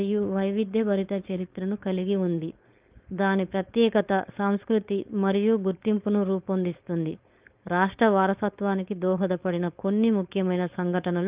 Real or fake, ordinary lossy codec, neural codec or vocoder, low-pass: fake; Opus, 32 kbps; codec, 16 kHz, 4.8 kbps, FACodec; 3.6 kHz